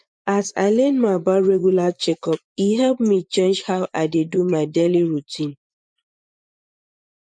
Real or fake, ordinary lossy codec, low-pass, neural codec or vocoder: fake; none; 9.9 kHz; vocoder, 24 kHz, 100 mel bands, Vocos